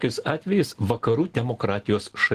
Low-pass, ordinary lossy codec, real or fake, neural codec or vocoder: 14.4 kHz; Opus, 16 kbps; real; none